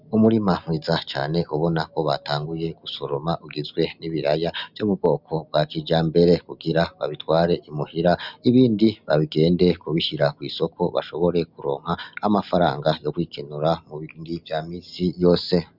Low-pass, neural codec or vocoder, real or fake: 5.4 kHz; none; real